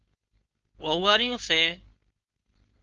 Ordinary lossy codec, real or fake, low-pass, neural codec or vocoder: Opus, 32 kbps; fake; 7.2 kHz; codec, 16 kHz, 4.8 kbps, FACodec